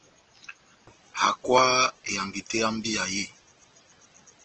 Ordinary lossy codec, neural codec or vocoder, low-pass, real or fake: Opus, 16 kbps; none; 7.2 kHz; real